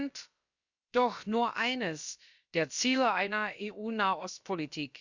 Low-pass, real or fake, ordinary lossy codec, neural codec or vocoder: 7.2 kHz; fake; Opus, 64 kbps; codec, 16 kHz, about 1 kbps, DyCAST, with the encoder's durations